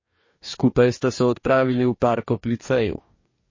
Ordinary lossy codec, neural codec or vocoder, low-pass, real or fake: MP3, 32 kbps; codec, 44.1 kHz, 2.6 kbps, DAC; 7.2 kHz; fake